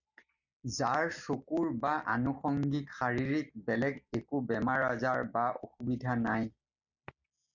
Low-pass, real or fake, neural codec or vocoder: 7.2 kHz; real; none